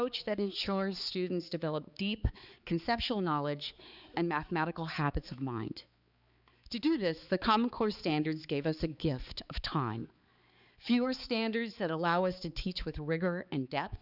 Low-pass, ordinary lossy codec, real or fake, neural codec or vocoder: 5.4 kHz; Opus, 64 kbps; fake; codec, 16 kHz, 4 kbps, X-Codec, HuBERT features, trained on balanced general audio